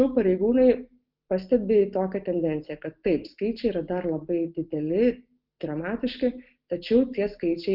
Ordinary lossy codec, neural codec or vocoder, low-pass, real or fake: Opus, 24 kbps; none; 5.4 kHz; real